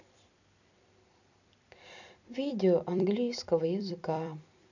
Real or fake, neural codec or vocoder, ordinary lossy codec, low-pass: fake; vocoder, 44.1 kHz, 128 mel bands every 256 samples, BigVGAN v2; none; 7.2 kHz